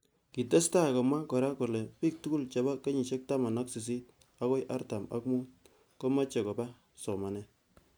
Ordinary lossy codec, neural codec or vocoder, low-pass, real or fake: none; none; none; real